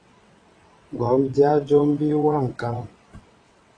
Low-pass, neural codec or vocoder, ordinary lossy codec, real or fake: 9.9 kHz; vocoder, 22.05 kHz, 80 mel bands, WaveNeXt; AAC, 32 kbps; fake